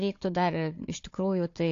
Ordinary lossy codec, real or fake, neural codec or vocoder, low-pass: AAC, 48 kbps; fake; codec, 16 kHz, 4 kbps, FunCodec, trained on Chinese and English, 50 frames a second; 7.2 kHz